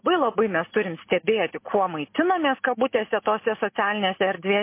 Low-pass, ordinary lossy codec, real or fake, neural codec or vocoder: 3.6 kHz; MP3, 24 kbps; real; none